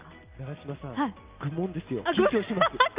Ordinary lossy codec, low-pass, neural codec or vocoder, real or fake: Opus, 24 kbps; 3.6 kHz; none; real